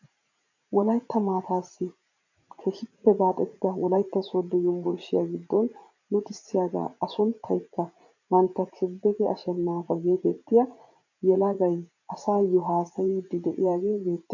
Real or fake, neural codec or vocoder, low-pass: fake; vocoder, 44.1 kHz, 80 mel bands, Vocos; 7.2 kHz